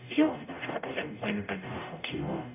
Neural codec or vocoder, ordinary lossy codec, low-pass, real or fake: codec, 44.1 kHz, 0.9 kbps, DAC; none; 3.6 kHz; fake